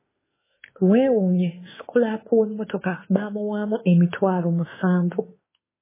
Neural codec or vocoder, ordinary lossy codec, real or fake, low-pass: autoencoder, 48 kHz, 32 numbers a frame, DAC-VAE, trained on Japanese speech; MP3, 16 kbps; fake; 3.6 kHz